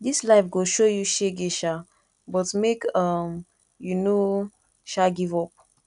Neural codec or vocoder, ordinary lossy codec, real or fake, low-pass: none; none; real; 10.8 kHz